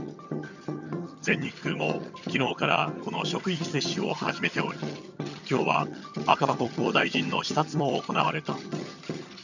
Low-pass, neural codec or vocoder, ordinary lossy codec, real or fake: 7.2 kHz; vocoder, 22.05 kHz, 80 mel bands, HiFi-GAN; none; fake